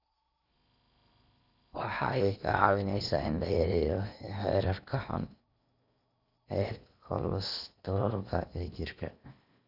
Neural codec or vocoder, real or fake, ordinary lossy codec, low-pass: codec, 16 kHz in and 24 kHz out, 0.8 kbps, FocalCodec, streaming, 65536 codes; fake; none; 5.4 kHz